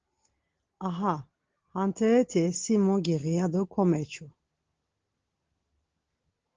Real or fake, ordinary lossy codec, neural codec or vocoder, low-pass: real; Opus, 16 kbps; none; 7.2 kHz